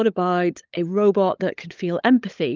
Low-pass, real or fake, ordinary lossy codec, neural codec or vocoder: 7.2 kHz; fake; Opus, 24 kbps; codec, 16 kHz, 16 kbps, FunCodec, trained on LibriTTS, 50 frames a second